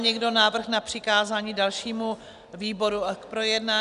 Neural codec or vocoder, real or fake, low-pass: none; real; 10.8 kHz